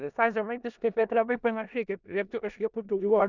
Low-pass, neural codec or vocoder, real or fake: 7.2 kHz; codec, 16 kHz in and 24 kHz out, 0.4 kbps, LongCat-Audio-Codec, four codebook decoder; fake